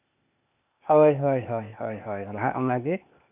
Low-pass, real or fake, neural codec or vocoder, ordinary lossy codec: 3.6 kHz; fake; codec, 16 kHz, 0.8 kbps, ZipCodec; none